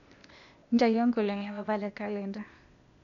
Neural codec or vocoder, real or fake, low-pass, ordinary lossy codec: codec, 16 kHz, 0.8 kbps, ZipCodec; fake; 7.2 kHz; none